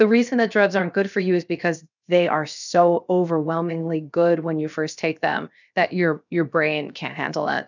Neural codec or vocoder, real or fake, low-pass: codec, 16 kHz, 0.7 kbps, FocalCodec; fake; 7.2 kHz